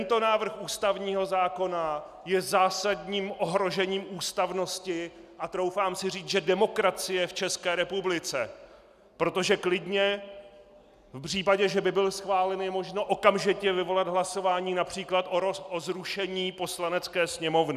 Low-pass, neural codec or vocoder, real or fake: 14.4 kHz; none; real